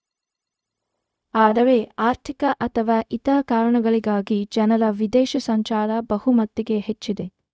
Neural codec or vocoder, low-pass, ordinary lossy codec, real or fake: codec, 16 kHz, 0.4 kbps, LongCat-Audio-Codec; none; none; fake